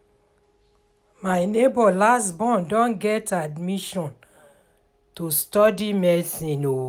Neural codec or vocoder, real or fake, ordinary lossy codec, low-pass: none; real; none; 19.8 kHz